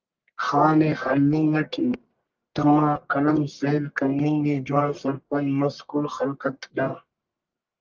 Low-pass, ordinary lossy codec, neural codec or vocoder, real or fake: 7.2 kHz; Opus, 32 kbps; codec, 44.1 kHz, 1.7 kbps, Pupu-Codec; fake